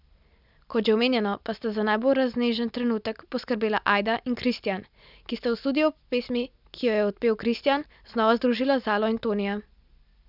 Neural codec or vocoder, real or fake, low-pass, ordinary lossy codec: none; real; 5.4 kHz; none